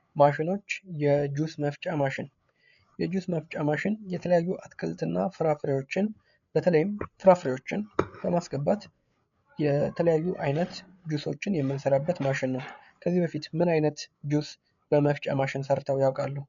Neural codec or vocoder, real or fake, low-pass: codec, 16 kHz, 8 kbps, FreqCodec, larger model; fake; 7.2 kHz